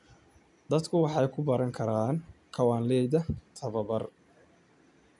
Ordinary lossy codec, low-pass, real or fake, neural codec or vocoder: none; 10.8 kHz; real; none